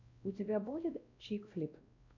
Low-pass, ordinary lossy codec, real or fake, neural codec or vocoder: 7.2 kHz; MP3, 48 kbps; fake; codec, 16 kHz, 1 kbps, X-Codec, WavLM features, trained on Multilingual LibriSpeech